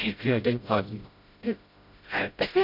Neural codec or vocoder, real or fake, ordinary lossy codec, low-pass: codec, 16 kHz, 0.5 kbps, FreqCodec, smaller model; fake; MP3, 48 kbps; 5.4 kHz